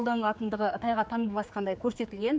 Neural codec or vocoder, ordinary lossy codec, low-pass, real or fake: codec, 16 kHz, 4 kbps, X-Codec, HuBERT features, trained on general audio; none; none; fake